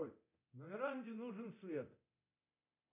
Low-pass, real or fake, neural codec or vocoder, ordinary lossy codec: 3.6 kHz; fake; codec, 24 kHz, 0.9 kbps, DualCodec; MP3, 32 kbps